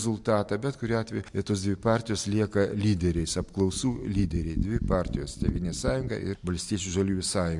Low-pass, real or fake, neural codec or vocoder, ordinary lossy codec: 10.8 kHz; real; none; MP3, 64 kbps